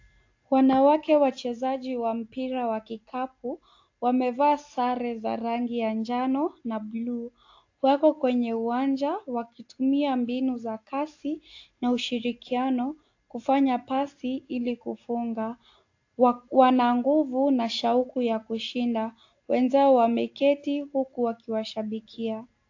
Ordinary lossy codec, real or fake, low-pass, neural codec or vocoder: AAC, 48 kbps; real; 7.2 kHz; none